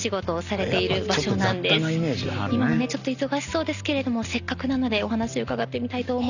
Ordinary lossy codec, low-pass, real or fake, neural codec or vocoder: none; 7.2 kHz; real; none